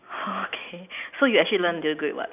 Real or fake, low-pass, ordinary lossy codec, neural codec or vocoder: real; 3.6 kHz; none; none